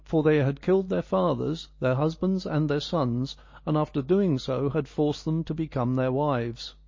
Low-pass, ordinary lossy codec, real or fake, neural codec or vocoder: 7.2 kHz; MP3, 32 kbps; real; none